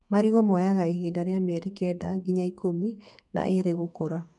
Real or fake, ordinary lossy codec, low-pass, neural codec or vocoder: fake; none; 10.8 kHz; codec, 32 kHz, 1.9 kbps, SNAC